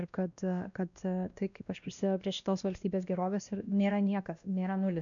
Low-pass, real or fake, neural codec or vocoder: 7.2 kHz; fake; codec, 16 kHz, 1 kbps, X-Codec, WavLM features, trained on Multilingual LibriSpeech